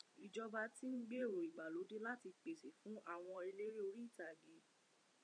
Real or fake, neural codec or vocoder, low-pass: fake; vocoder, 44.1 kHz, 128 mel bands every 512 samples, BigVGAN v2; 9.9 kHz